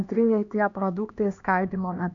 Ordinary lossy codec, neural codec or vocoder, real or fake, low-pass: AAC, 64 kbps; codec, 16 kHz, 1 kbps, X-Codec, HuBERT features, trained on LibriSpeech; fake; 7.2 kHz